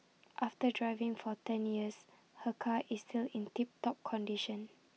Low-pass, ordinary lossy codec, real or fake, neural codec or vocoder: none; none; real; none